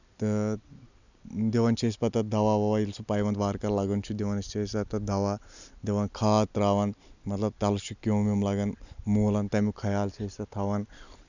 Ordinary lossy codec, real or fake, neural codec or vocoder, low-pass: none; real; none; 7.2 kHz